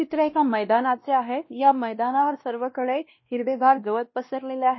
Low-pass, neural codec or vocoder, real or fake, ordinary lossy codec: 7.2 kHz; codec, 16 kHz, 1 kbps, X-Codec, WavLM features, trained on Multilingual LibriSpeech; fake; MP3, 24 kbps